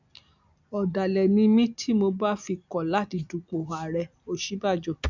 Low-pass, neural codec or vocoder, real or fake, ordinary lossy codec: 7.2 kHz; none; real; none